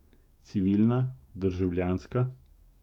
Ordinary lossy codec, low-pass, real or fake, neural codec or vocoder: none; 19.8 kHz; fake; codec, 44.1 kHz, 7.8 kbps, DAC